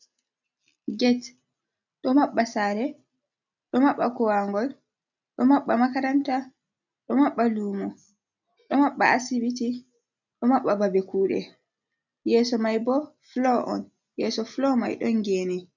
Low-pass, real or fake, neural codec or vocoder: 7.2 kHz; real; none